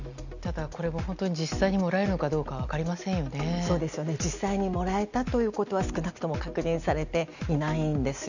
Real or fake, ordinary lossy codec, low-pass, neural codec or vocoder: real; none; 7.2 kHz; none